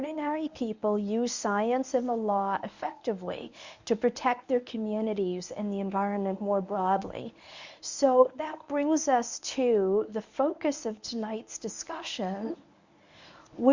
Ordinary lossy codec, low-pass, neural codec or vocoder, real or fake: Opus, 64 kbps; 7.2 kHz; codec, 24 kHz, 0.9 kbps, WavTokenizer, medium speech release version 1; fake